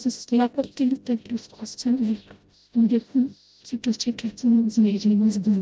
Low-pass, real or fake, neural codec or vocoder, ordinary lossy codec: none; fake; codec, 16 kHz, 0.5 kbps, FreqCodec, smaller model; none